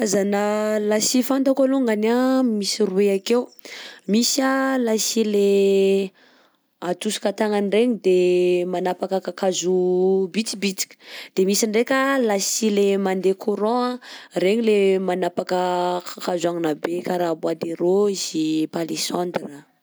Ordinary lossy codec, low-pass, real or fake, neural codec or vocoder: none; none; real; none